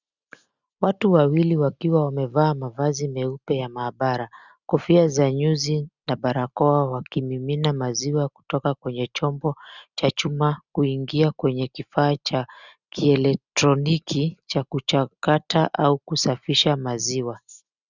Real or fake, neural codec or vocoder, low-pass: real; none; 7.2 kHz